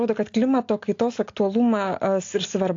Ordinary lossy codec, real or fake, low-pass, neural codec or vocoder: AAC, 48 kbps; real; 7.2 kHz; none